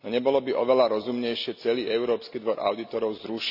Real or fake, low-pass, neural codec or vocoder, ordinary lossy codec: real; 5.4 kHz; none; none